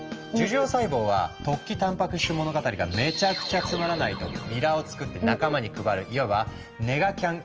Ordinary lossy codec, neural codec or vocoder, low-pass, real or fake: Opus, 24 kbps; none; 7.2 kHz; real